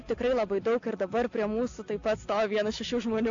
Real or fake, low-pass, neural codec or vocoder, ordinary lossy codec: real; 7.2 kHz; none; AAC, 48 kbps